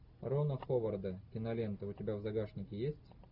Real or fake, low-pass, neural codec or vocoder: real; 5.4 kHz; none